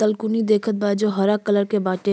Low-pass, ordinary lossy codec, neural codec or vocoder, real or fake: none; none; none; real